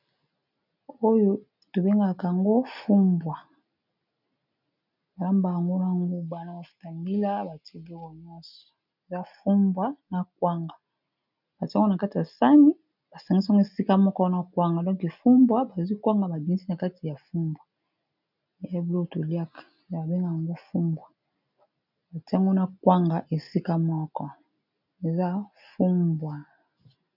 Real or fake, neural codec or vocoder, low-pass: real; none; 5.4 kHz